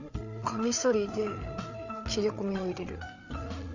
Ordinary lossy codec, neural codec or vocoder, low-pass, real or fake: none; codec, 16 kHz, 8 kbps, FreqCodec, larger model; 7.2 kHz; fake